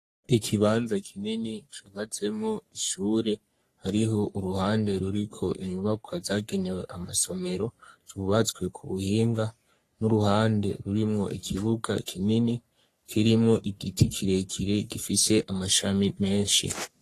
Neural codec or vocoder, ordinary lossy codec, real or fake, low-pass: codec, 44.1 kHz, 3.4 kbps, Pupu-Codec; AAC, 48 kbps; fake; 14.4 kHz